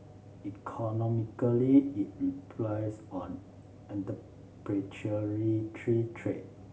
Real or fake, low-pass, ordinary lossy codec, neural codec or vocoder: real; none; none; none